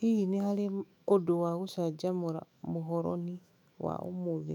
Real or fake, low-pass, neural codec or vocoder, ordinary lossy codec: fake; 19.8 kHz; autoencoder, 48 kHz, 128 numbers a frame, DAC-VAE, trained on Japanese speech; none